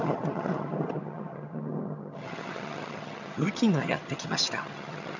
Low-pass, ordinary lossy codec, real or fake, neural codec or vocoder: 7.2 kHz; none; fake; vocoder, 22.05 kHz, 80 mel bands, HiFi-GAN